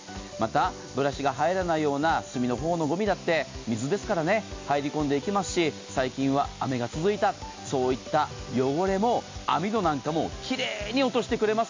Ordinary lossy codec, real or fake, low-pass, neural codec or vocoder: MP3, 64 kbps; real; 7.2 kHz; none